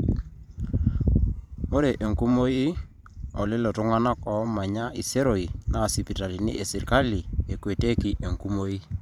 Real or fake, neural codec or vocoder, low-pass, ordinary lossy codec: fake; vocoder, 44.1 kHz, 128 mel bands every 512 samples, BigVGAN v2; 19.8 kHz; none